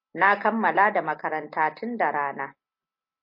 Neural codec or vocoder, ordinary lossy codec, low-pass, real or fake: none; MP3, 32 kbps; 5.4 kHz; real